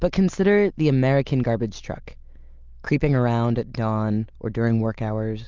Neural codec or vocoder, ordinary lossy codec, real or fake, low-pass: none; Opus, 32 kbps; real; 7.2 kHz